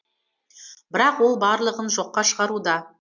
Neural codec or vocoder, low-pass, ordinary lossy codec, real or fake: none; 7.2 kHz; MP3, 48 kbps; real